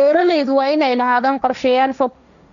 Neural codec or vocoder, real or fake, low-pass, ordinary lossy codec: codec, 16 kHz, 1.1 kbps, Voila-Tokenizer; fake; 7.2 kHz; none